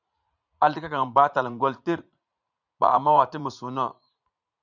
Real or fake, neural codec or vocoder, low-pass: fake; vocoder, 44.1 kHz, 80 mel bands, Vocos; 7.2 kHz